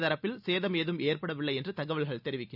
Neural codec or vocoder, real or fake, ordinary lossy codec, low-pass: none; real; none; 5.4 kHz